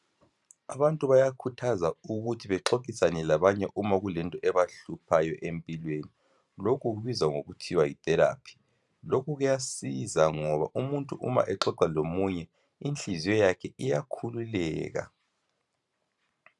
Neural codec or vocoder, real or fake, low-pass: vocoder, 44.1 kHz, 128 mel bands every 512 samples, BigVGAN v2; fake; 10.8 kHz